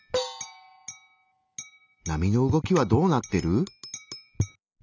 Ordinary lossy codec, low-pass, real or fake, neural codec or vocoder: none; 7.2 kHz; real; none